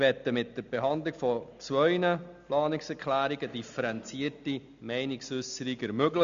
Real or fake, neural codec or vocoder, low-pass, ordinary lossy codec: real; none; 7.2 kHz; MP3, 64 kbps